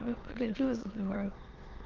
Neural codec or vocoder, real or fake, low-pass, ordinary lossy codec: autoencoder, 22.05 kHz, a latent of 192 numbers a frame, VITS, trained on many speakers; fake; 7.2 kHz; Opus, 32 kbps